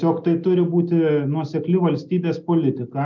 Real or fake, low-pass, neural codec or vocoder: real; 7.2 kHz; none